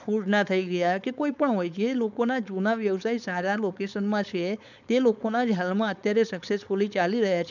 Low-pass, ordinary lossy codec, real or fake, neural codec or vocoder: 7.2 kHz; none; fake; codec, 16 kHz, 4.8 kbps, FACodec